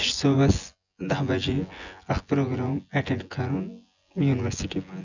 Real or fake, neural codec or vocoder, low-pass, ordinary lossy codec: fake; vocoder, 24 kHz, 100 mel bands, Vocos; 7.2 kHz; none